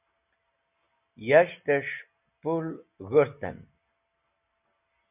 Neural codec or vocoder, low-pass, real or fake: none; 3.6 kHz; real